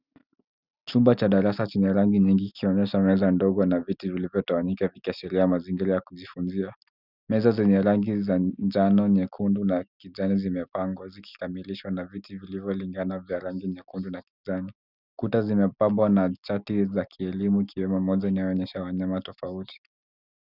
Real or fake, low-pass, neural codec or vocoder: real; 5.4 kHz; none